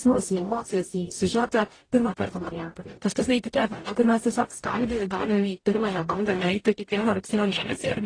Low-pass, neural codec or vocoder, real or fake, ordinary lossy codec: 9.9 kHz; codec, 44.1 kHz, 0.9 kbps, DAC; fake; AAC, 32 kbps